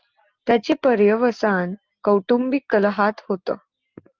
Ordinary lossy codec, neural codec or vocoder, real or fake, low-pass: Opus, 32 kbps; none; real; 7.2 kHz